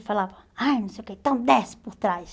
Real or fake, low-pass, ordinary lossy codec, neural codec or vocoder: real; none; none; none